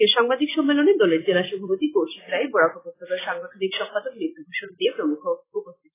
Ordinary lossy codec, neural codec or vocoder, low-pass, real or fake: AAC, 16 kbps; none; 3.6 kHz; real